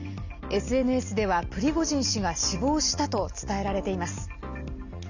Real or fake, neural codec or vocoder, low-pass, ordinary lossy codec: real; none; 7.2 kHz; none